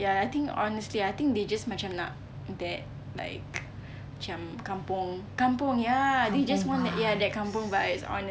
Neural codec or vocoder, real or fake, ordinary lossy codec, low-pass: none; real; none; none